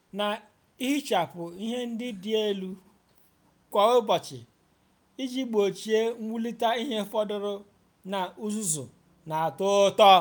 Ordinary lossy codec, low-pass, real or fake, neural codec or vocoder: none; none; real; none